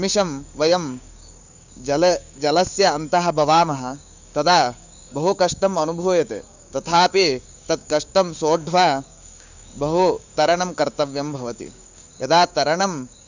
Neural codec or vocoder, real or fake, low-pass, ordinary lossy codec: codec, 16 kHz, 6 kbps, DAC; fake; 7.2 kHz; none